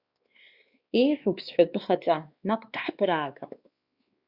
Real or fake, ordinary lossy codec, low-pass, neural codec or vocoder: fake; Opus, 64 kbps; 5.4 kHz; codec, 16 kHz, 2 kbps, X-Codec, WavLM features, trained on Multilingual LibriSpeech